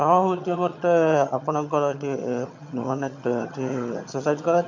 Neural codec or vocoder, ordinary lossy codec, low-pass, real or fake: vocoder, 22.05 kHz, 80 mel bands, HiFi-GAN; MP3, 64 kbps; 7.2 kHz; fake